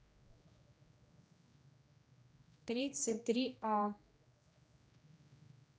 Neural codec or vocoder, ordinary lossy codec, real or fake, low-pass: codec, 16 kHz, 1 kbps, X-Codec, HuBERT features, trained on general audio; none; fake; none